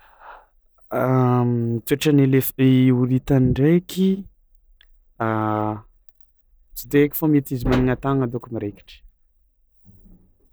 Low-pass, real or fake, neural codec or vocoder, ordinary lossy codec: none; real; none; none